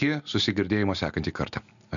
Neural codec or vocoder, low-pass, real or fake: none; 7.2 kHz; real